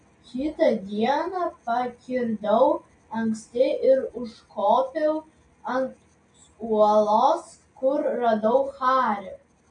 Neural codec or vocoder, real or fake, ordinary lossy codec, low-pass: none; real; MP3, 48 kbps; 9.9 kHz